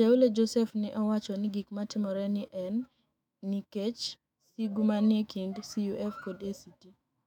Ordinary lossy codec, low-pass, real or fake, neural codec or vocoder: none; 19.8 kHz; fake; autoencoder, 48 kHz, 128 numbers a frame, DAC-VAE, trained on Japanese speech